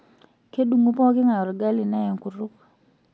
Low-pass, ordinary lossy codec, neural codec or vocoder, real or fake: none; none; none; real